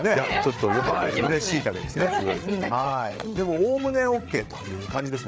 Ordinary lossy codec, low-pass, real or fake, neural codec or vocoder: none; none; fake; codec, 16 kHz, 8 kbps, FreqCodec, larger model